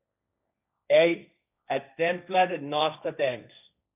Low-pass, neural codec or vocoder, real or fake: 3.6 kHz; codec, 16 kHz, 1.1 kbps, Voila-Tokenizer; fake